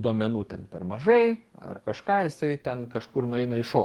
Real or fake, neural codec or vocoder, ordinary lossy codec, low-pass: fake; codec, 44.1 kHz, 2.6 kbps, DAC; Opus, 24 kbps; 19.8 kHz